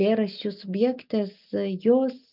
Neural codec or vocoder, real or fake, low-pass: none; real; 5.4 kHz